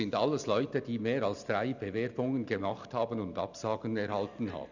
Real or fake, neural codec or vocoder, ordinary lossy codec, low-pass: real; none; none; 7.2 kHz